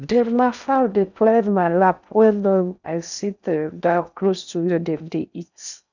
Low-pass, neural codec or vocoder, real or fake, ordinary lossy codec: 7.2 kHz; codec, 16 kHz in and 24 kHz out, 0.6 kbps, FocalCodec, streaming, 4096 codes; fake; none